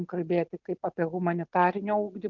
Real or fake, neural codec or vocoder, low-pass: real; none; 7.2 kHz